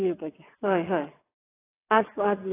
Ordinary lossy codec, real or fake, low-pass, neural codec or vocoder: AAC, 16 kbps; fake; 3.6 kHz; vocoder, 22.05 kHz, 80 mel bands, WaveNeXt